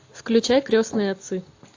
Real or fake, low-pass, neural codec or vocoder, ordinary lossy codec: real; 7.2 kHz; none; AAC, 48 kbps